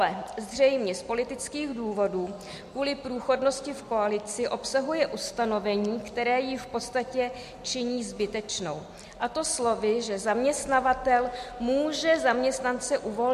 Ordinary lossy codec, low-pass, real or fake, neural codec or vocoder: MP3, 64 kbps; 14.4 kHz; real; none